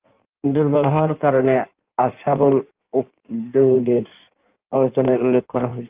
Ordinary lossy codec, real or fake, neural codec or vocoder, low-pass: Opus, 24 kbps; fake; codec, 16 kHz in and 24 kHz out, 1.1 kbps, FireRedTTS-2 codec; 3.6 kHz